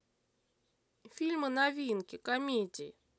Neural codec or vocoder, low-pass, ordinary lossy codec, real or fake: none; none; none; real